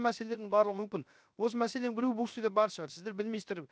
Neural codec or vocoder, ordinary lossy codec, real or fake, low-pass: codec, 16 kHz, 0.7 kbps, FocalCodec; none; fake; none